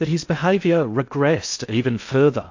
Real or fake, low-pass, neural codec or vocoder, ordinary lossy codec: fake; 7.2 kHz; codec, 16 kHz in and 24 kHz out, 0.6 kbps, FocalCodec, streaming, 2048 codes; AAC, 48 kbps